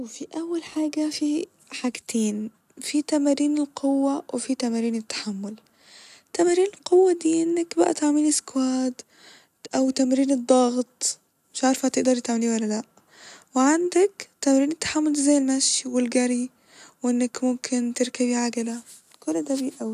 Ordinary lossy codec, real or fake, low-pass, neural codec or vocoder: none; real; 14.4 kHz; none